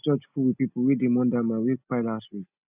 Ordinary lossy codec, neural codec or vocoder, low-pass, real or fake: none; none; 3.6 kHz; real